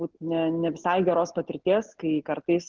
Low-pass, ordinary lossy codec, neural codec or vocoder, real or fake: 7.2 kHz; Opus, 16 kbps; none; real